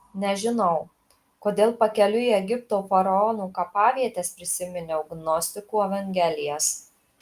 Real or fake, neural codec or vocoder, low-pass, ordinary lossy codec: real; none; 14.4 kHz; Opus, 32 kbps